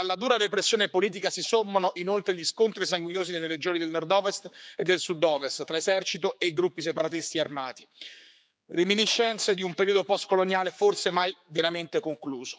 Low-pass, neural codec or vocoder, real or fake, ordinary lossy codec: none; codec, 16 kHz, 4 kbps, X-Codec, HuBERT features, trained on general audio; fake; none